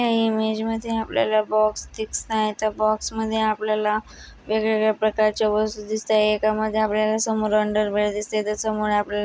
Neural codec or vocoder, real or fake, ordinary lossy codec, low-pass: none; real; none; none